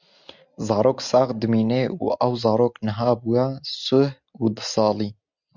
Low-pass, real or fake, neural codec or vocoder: 7.2 kHz; real; none